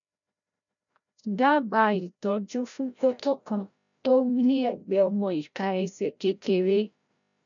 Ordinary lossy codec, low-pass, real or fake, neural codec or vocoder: none; 7.2 kHz; fake; codec, 16 kHz, 0.5 kbps, FreqCodec, larger model